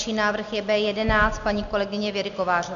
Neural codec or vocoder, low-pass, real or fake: none; 7.2 kHz; real